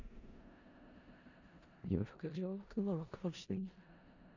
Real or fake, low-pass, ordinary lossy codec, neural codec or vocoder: fake; 7.2 kHz; none; codec, 16 kHz in and 24 kHz out, 0.4 kbps, LongCat-Audio-Codec, four codebook decoder